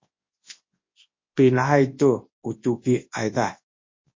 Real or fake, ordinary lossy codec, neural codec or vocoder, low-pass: fake; MP3, 32 kbps; codec, 24 kHz, 0.9 kbps, WavTokenizer, large speech release; 7.2 kHz